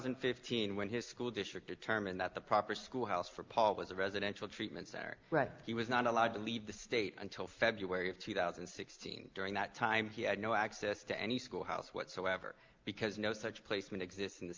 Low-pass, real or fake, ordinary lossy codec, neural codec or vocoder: 7.2 kHz; real; Opus, 24 kbps; none